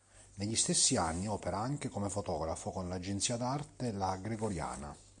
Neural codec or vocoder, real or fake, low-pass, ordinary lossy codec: none; real; 9.9 kHz; MP3, 96 kbps